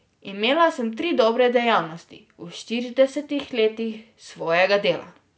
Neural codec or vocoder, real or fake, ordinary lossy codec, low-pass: none; real; none; none